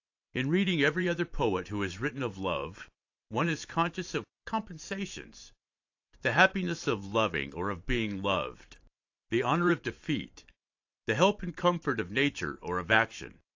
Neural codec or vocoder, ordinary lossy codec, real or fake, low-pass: vocoder, 44.1 kHz, 128 mel bands every 512 samples, BigVGAN v2; AAC, 48 kbps; fake; 7.2 kHz